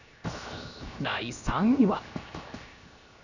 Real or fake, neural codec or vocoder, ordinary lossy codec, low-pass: fake; codec, 16 kHz, 0.7 kbps, FocalCodec; none; 7.2 kHz